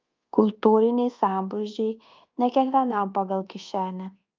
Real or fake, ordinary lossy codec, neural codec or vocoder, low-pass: fake; Opus, 24 kbps; codec, 24 kHz, 1.2 kbps, DualCodec; 7.2 kHz